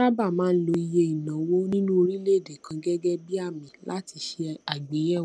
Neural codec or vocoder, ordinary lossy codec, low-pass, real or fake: none; none; none; real